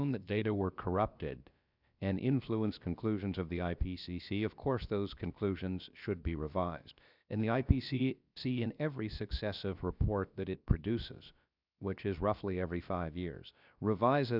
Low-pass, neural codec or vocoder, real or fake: 5.4 kHz; codec, 16 kHz, about 1 kbps, DyCAST, with the encoder's durations; fake